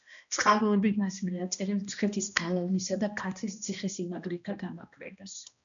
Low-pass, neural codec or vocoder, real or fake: 7.2 kHz; codec, 16 kHz, 1 kbps, X-Codec, HuBERT features, trained on balanced general audio; fake